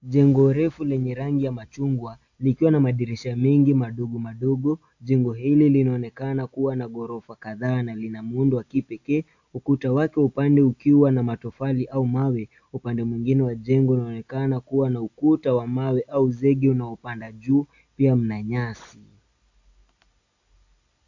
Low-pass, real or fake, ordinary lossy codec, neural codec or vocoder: 7.2 kHz; real; AAC, 48 kbps; none